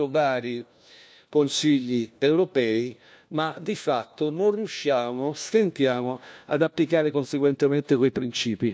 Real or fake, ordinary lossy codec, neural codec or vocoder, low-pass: fake; none; codec, 16 kHz, 1 kbps, FunCodec, trained on LibriTTS, 50 frames a second; none